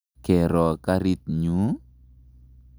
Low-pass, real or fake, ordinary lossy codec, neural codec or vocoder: none; real; none; none